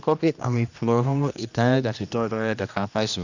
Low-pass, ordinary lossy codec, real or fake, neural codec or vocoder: 7.2 kHz; none; fake; codec, 16 kHz, 1 kbps, X-Codec, HuBERT features, trained on general audio